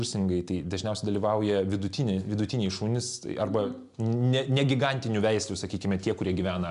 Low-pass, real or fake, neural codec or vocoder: 10.8 kHz; real; none